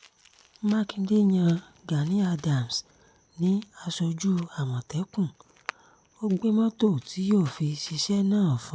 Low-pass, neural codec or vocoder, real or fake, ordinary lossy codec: none; none; real; none